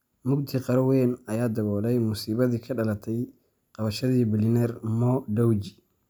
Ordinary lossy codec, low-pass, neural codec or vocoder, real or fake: none; none; none; real